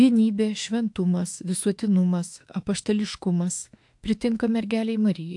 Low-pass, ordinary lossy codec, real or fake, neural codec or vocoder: 10.8 kHz; AAC, 64 kbps; fake; autoencoder, 48 kHz, 32 numbers a frame, DAC-VAE, trained on Japanese speech